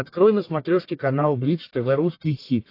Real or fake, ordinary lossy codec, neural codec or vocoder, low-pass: fake; AAC, 32 kbps; codec, 44.1 kHz, 1.7 kbps, Pupu-Codec; 5.4 kHz